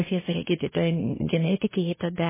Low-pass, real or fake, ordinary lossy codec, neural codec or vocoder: 3.6 kHz; fake; MP3, 16 kbps; codec, 24 kHz, 1 kbps, SNAC